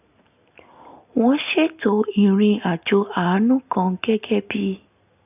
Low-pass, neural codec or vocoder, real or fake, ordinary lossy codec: 3.6 kHz; none; real; none